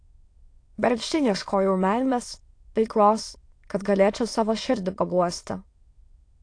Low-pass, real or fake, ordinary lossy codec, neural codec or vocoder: 9.9 kHz; fake; AAC, 48 kbps; autoencoder, 22.05 kHz, a latent of 192 numbers a frame, VITS, trained on many speakers